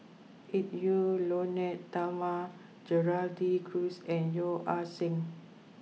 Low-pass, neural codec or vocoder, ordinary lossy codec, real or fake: none; none; none; real